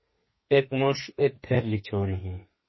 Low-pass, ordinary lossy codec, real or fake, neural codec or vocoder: 7.2 kHz; MP3, 24 kbps; fake; codec, 32 kHz, 1.9 kbps, SNAC